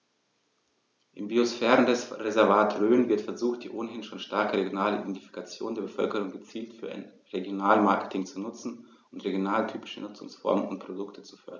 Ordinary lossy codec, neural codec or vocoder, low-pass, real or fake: none; none; 7.2 kHz; real